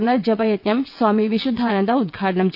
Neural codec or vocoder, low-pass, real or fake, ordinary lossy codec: vocoder, 22.05 kHz, 80 mel bands, WaveNeXt; 5.4 kHz; fake; none